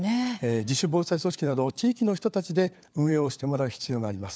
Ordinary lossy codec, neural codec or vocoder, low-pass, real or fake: none; codec, 16 kHz, 4 kbps, FunCodec, trained on LibriTTS, 50 frames a second; none; fake